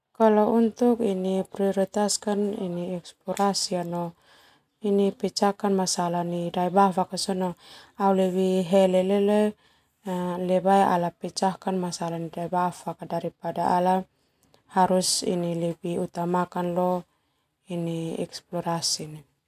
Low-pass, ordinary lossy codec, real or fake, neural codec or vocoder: 14.4 kHz; none; real; none